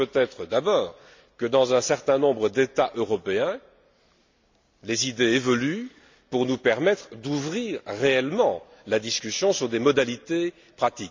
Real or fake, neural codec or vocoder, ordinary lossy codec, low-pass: real; none; MP3, 64 kbps; 7.2 kHz